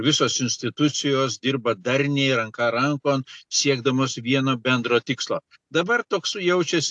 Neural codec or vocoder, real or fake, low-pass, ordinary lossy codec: none; real; 10.8 kHz; AAC, 64 kbps